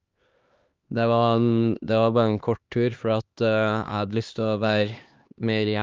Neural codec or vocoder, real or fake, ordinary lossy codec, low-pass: codec, 16 kHz, 2 kbps, X-Codec, HuBERT features, trained on LibriSpeech; fake; Opus, 32 kbps; 7.2 kHz